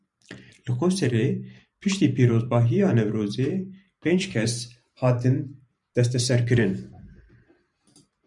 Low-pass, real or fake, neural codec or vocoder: 10.8 kHz; fake; vocoder, 44.1 kHz, 128 mel bands every 512 samples, BigVGAN v2